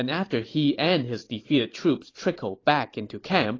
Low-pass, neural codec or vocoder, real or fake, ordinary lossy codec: 7.2 kHz; vocoder, 44.1 kHz, 128 mel bands every 512 samples, BigVGAN v2; fake; AAC, 32 kbps